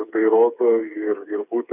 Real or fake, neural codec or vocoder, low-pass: fake; codec, 16 kHz, 4 kbps, FreqCodec, smaller model; 3.6 kHz